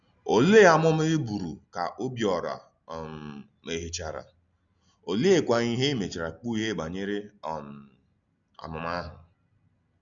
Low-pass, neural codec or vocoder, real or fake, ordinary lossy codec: 7.2 kHz; none; real; none